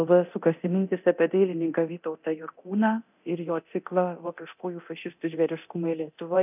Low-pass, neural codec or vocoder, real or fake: 3.6 kHz; codec, 24 kHz, 0.9 kbps, DualCodec; fake